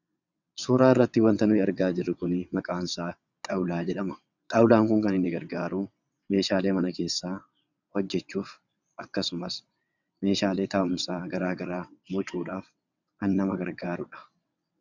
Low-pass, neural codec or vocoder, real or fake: 7.2 kHz; vocoder, 22.05 kHz, 80 mel bands, WaveNeXt; fake